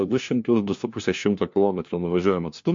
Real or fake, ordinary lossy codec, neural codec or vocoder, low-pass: fake; MP3, 48 kbps; codec, 16 kHz, 1 kbps, FunCodec, trained on LibriTTS, 50 frames a second; 7.2 kHz